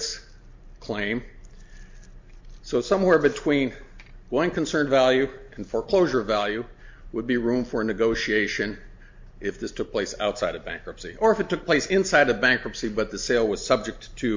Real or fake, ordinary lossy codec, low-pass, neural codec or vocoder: real; MP3, 48 kbps; 7.2 kHz; none